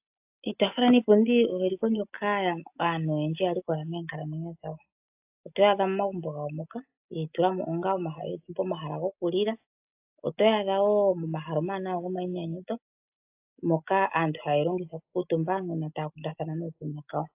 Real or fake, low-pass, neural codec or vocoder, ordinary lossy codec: real; 3.6 kHz; none; AAC, 32 kbps